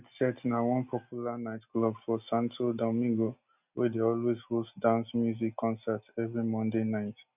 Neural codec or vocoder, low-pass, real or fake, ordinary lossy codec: none; 3.6 kHz; real; none